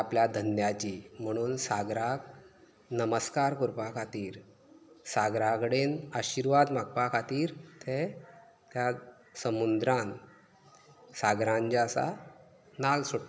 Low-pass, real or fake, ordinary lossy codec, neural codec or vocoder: none; real; none; none